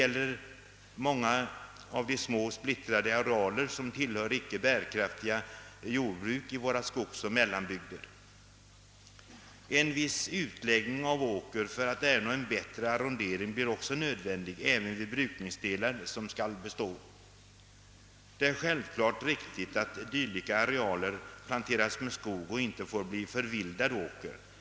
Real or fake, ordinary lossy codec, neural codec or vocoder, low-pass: real; none; none; none